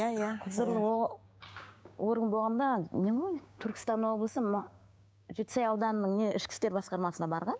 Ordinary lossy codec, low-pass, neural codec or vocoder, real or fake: none; none; codec, 16 kHz, 6 kbps, DAC; fake